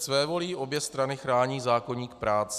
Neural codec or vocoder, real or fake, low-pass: none; real; 14.4 kHz